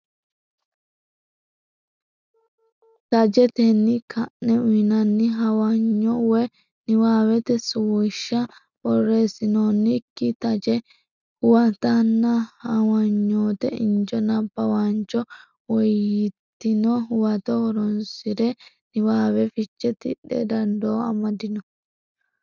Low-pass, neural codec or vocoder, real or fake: 7.2 kHz; none; real